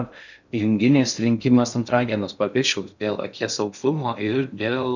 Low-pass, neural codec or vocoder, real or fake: 7.2 kHz; codec, 16 kHz in and 24 kHz out, 0.6 kbps, FocalCodec, streaming, 4096 codes; fake